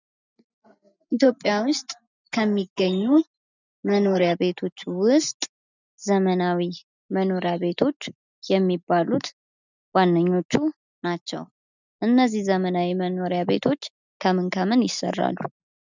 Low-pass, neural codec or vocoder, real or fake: 7.2 kHz; none; real